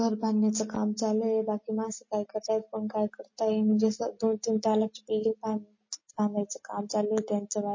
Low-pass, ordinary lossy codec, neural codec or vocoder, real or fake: 7.2 kHz; MP3, 32 kbps; none; real